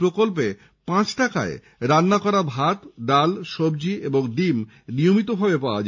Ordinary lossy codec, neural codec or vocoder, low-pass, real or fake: MP3, 48 kbps; none; 7.2 kHz; real